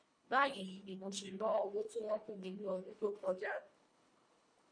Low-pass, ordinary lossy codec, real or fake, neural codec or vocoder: 9.9 kHz; MP3, 48 kbps; fake; codec, 24 kHz, 1.5 kbps, HILCodec